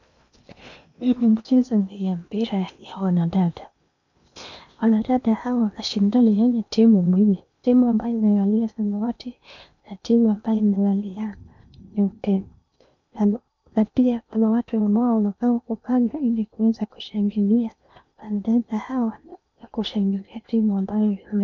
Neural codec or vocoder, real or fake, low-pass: codec, 16 kHz in and 24 kHz out, 0.8 kbps, FocalCodec, streaming, 65536 codes; fake; 7.2 kHz